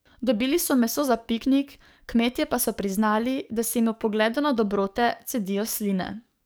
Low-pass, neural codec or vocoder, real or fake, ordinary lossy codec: none; codec, 44.1 kHz, 7.8 kbps, DAC; fake; none